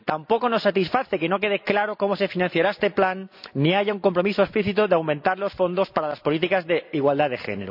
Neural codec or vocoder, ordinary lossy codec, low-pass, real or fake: none; none; 5.4 kHz; real